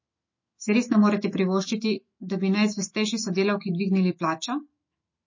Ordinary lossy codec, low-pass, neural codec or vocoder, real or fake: MP3, 32 kbps; 7.2 kHz; autoencoder, 48 kHz, 128 numbers a frame, DAC-VAE, trained on Japanese speech; fake